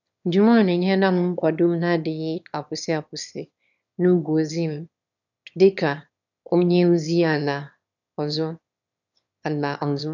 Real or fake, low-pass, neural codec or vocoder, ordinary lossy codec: fake; 7.2 kHz; autoencoder, 22.05 kHz, a latent of 192 numbers a frame, VITS, trained on one speaker; none